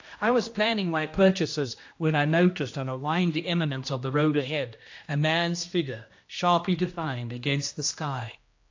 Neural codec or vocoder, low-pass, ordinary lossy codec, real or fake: codec, 16 kHz, 1 kbps, X-Codec, HuBERT features, trained on general audio; 7.2 kHz; AAC, 48 kbps; fake